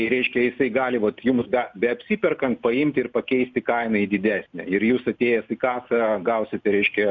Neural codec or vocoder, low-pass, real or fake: none; 7.2 kHz; real